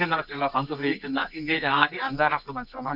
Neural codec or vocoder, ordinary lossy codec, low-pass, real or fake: codec, 24 kHz, 0.9 kbps, WavTokenizer, medium music audio release; none; 5.4 kHz; fake